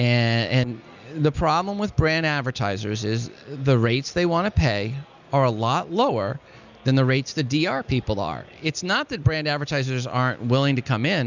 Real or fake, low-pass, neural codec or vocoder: real; 7.2 kHz; none